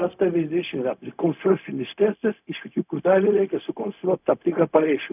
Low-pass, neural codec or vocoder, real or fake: 3.6 kHz; codec, 16 kHz, 0.4 kbps, LongCat-Audio-Codec; fake